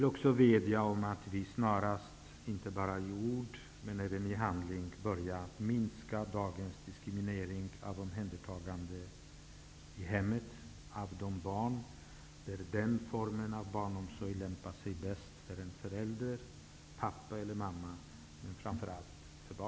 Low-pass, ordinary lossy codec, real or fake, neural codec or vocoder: none; none; real; none